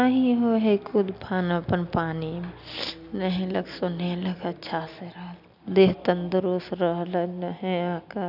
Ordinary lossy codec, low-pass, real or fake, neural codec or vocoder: none; 5.4 kHz; real; none